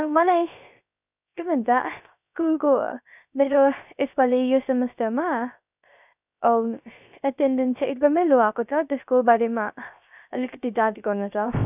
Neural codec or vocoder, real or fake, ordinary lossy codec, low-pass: codec, 16 kHz, 0.3 kbps, FocalCodec; fake; none; 3.6 kHz